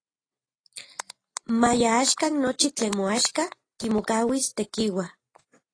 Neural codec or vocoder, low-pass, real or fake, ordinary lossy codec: none; 9.9 kHz; real; AAC, 32 kbps